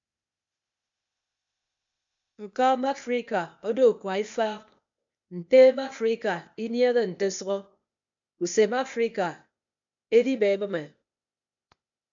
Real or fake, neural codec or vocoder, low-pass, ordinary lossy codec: fake; codec, 16 kHz, 0.8 kbps, ZipCodec; 7.2 kHz; MP3, 64 kbps